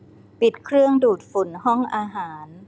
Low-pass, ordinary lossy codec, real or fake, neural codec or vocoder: none; none; real; none